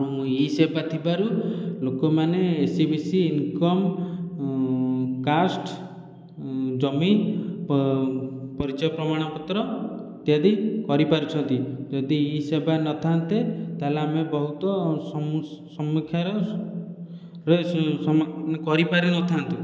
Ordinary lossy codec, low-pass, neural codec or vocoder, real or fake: none; none; none; real